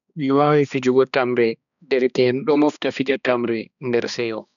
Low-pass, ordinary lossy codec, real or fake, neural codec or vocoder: 7.2 kHz; none; fake; codec, 16 kHz, 2 kbps, X-Codec, HuBERT features, trained on balanced general audio